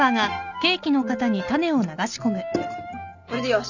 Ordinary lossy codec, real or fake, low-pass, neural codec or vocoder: none; real; 7.2 kHz; none